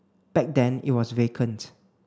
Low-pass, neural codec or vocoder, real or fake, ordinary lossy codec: none; none; real; none